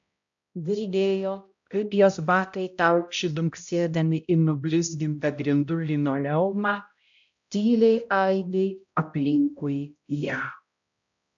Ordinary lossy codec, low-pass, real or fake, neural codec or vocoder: AAC, 64 kbps; 7.2 kHz; fake; codec, 16 kHz, 0.5 kbps, X-Codec, HuBERT features, trained on balanced general audio